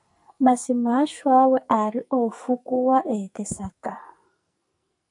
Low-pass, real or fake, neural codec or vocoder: 10.8 kHz; fake; codec, 44.1 kHz, 2.6 kbps, SNAC